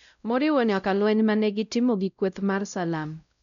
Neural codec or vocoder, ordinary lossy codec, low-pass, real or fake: codec, 16 kHz, 0.5 kbps, X-Codec, WavLM features, trained on Multilingual LibriSpeech; none; 7.2 kHz; fake